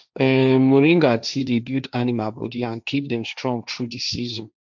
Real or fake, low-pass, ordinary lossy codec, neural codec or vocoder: fake; 7.2 kHz; none; codec, 16 kHz, 1.1 kbps, Voila-Tokenizer